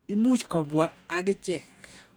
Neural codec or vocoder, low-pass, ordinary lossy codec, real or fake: codec, 44.1 kHz, 2.6 kbps, DAC; none; none; fake